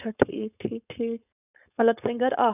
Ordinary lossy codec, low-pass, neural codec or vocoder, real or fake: none; 3.6 kHz; codec, 16 kHz, 4.8 kbps, FACodec; fake